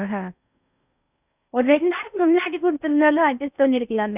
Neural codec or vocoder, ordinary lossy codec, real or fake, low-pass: codec, 16 kHz in and 24 kHz out, 0.6 kbps, FocalCodec, streaming, 4096 codes; none; fake; 3.6 kHz